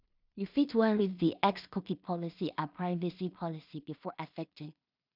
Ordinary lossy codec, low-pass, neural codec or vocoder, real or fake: none; 5.4 kHz; codec, 16 kHz in and 24 kHz out, 0.4 kbps, LongCat-Audio-Codec, two codebook decoder; fake